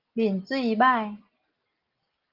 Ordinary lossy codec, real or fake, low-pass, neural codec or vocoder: Opus, 32 kbps; real; 5.4 kHz; none